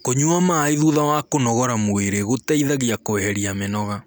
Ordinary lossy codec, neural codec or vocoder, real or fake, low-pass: none; none; real; none